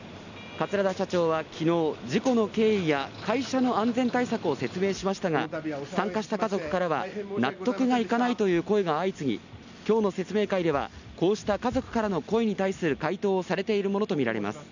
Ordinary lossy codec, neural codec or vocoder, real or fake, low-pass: none; none; real; 7.2 kHz